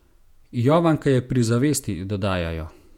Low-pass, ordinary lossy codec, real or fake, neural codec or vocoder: 19.8 kHz; none; fake; vocoder, 48 kHz, 128 mel bands, Vocos